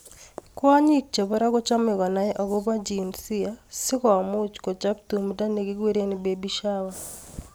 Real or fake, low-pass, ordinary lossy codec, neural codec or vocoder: real; none; none; none